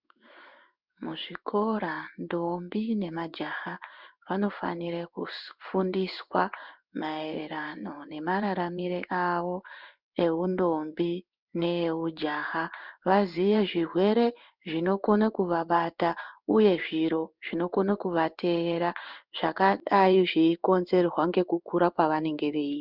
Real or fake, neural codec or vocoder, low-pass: fake; codec, 16 kHz in and 24 kHz out, 1 kbps, XY-Tokenizer; 5.4 kHz